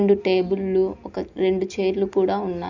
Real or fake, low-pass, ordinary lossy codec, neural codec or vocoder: real; 7.2 kHz; none; none